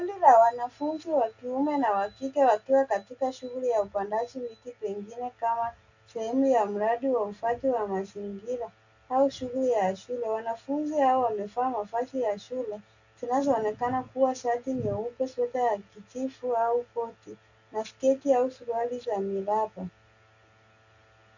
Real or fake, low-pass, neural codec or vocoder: real; 7.2 kHz; none